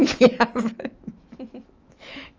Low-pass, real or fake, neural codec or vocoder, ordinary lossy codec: 7.2 kHz; fake; autoencoder, 48 kHz, 128 numbers a frame, DAC-VAE, trained on Japanese speech; Opus, 24 kbps